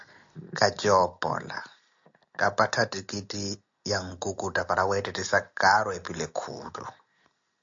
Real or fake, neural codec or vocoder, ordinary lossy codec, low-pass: real; none; AAC, 64 kbps; 7.2 kHz